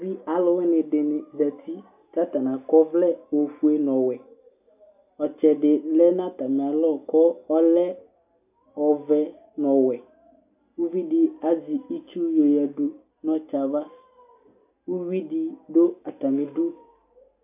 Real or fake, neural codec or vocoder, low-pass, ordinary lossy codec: real; none; 3.6 kHz; AAC, 32 kbps